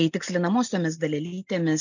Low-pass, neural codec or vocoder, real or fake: 7.2 kHz; none; real